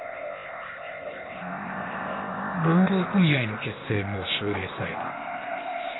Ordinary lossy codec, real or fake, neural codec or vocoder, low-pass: AAC, 16 kbps; fake; codec, 16 kHz, 0.8 kbps, ZipCodec; 7.2 kHz